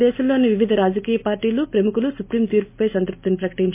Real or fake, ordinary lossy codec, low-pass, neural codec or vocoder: real; MP3, 24 kbps; 3.6 kHz; none